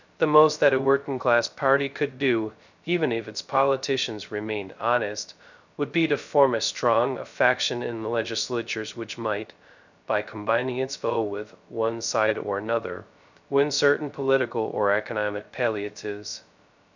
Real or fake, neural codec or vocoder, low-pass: fake; codec, 16 kHz, 0.2 kbps, FocalCodec; 7.2 kHz